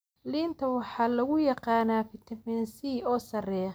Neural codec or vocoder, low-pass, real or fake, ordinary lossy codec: none; none; real; none